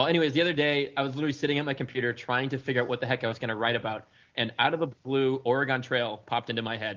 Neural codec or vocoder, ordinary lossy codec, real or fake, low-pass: none; Opus, 24 kbps; real; 7.2 kHz